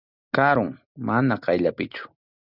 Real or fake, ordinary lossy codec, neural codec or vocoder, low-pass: real; Opus, 64 kbps; none; 5.4 kHz